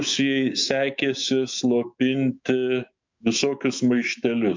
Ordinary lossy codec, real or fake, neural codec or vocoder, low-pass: MP3, 64 kbps; fake; autoencoder, 48 kHz, 128 numbers a frame, DAC-VAE, trained on Japanese speech; 7.2 kHz